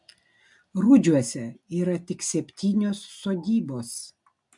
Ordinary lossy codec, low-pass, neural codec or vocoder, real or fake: MP3, 64 kbps; 10.8 kHz; none; real